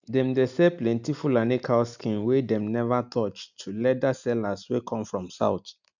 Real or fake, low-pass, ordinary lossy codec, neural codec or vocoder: real; 7.2 kHz; none; none